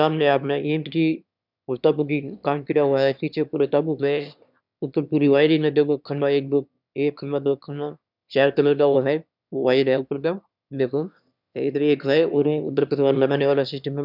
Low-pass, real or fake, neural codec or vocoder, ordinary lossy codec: 5.4 kHz; fake; autoencoder, 22.05 kHz, a latent of 192 numbers a frame, VITS, trained on one speaker; none